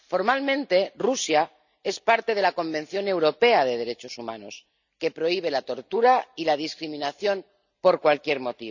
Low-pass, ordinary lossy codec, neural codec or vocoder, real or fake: 7.2 kHz; none; none; real